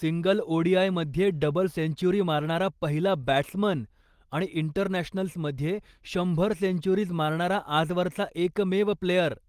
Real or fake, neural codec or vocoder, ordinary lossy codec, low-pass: real; none; Opus, 24 kbps; 14.4 kHz